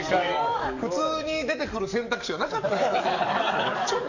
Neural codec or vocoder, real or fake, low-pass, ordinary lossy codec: codec, 44.1 kHz, 7.8 kbps, DAC; fake; 7.2 kHz; none